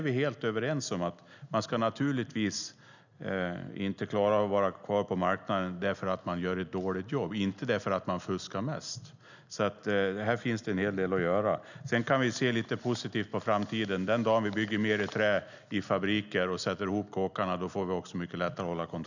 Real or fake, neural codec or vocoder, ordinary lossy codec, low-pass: real; none; none; 7.2 kHz